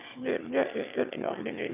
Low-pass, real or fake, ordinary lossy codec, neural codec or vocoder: 3.6 kHz; fake; none; autoencoder, 22.05 kHz, a latent of 192 numbers a frame, VITS, trained on one speaker